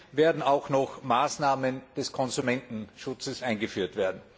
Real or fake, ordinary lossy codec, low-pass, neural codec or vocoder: real; none; none; none